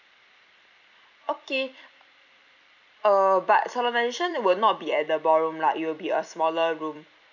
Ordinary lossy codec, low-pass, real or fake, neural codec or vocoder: none; 7.2 kHz; fake; vocoder, 44.1 kHz, 128 mel bands every 256 samples, BigVGAN v2